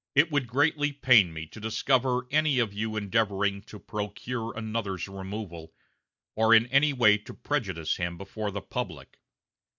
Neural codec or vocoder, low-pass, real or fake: none; 7.2 kHz; real